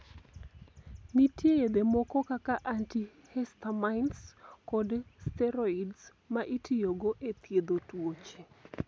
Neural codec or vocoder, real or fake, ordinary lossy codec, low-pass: none; real; none; none